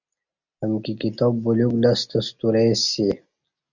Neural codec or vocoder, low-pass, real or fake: none; 7.2 kHz; real